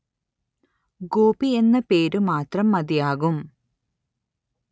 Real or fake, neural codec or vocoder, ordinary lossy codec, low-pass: real; none; none; none